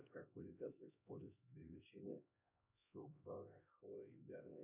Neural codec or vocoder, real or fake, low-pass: codec, 16 kHz, 1 kbps, X-Codec, HuBERT features, trained on LibriSpeech; fake; 3.6 kHz